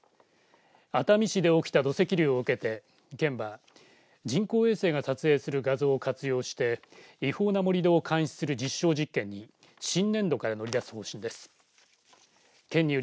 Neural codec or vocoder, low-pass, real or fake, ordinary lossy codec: none; none; real; none